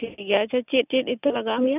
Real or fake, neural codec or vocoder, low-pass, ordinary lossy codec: real; none; 3.6 kHz; none